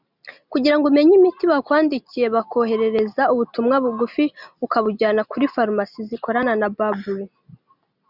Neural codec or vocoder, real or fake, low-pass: none; real; 5.4 kHz